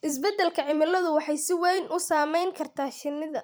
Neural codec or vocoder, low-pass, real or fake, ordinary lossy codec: vocoder, 44.1 kHz, 128 mel bands every 256 samples, BigVGAN v2; none; fake; none